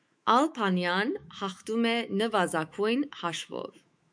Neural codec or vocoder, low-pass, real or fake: autoencoder, 48 kHz, 128 numbers a frame, DAC-VAE, trained on Japanese speech; 9.9 kHz; fake